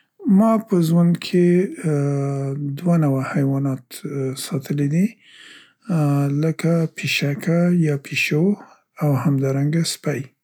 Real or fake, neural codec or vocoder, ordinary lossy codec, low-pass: real; none; none; 19.8 kHz